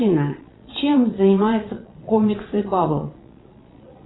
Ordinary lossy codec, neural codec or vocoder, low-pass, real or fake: AAC, 16 kbps; vocoder, 22.05 kHz, 80 mel bands, Vocos; 7.2 kHz; fake